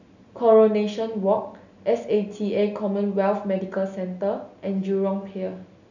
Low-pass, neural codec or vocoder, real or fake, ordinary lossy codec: 7.2 kHz; none; real; none